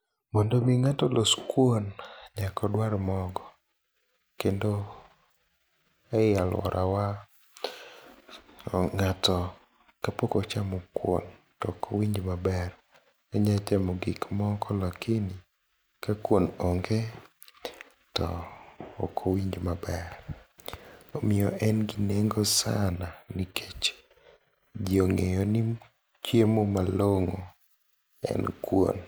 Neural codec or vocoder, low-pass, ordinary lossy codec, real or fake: none; none; none; real